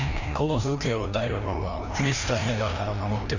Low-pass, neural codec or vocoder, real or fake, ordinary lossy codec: 7.2 kHz; codec, 16 kHz, 1 kbps, FreqCodec, larger model; fake; Opus, 64 kbps